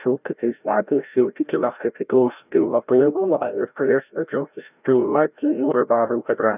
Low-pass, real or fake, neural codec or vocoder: 3.6 kHz; fake; codec, 16 kHz, 0.5 kbps, FreqCodec, larger model